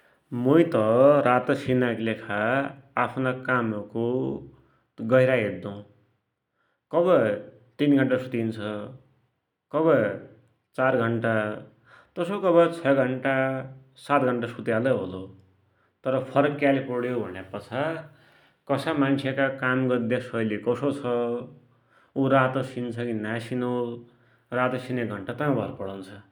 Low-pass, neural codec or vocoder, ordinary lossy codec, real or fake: 19.8 kHz; none; none; real